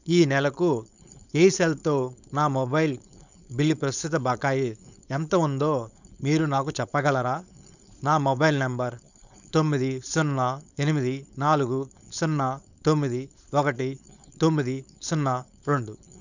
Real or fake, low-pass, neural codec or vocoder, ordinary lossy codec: fake; 7.2 kHz; codec, 16 kHz, 4.8 kbps, FACodec; none